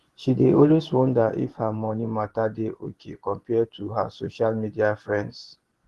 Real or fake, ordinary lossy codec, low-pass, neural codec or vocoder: fake; Opus, 16 kbps; 10.8 kHz; vocoder, 24 kHz, 100 mel bands, Vocos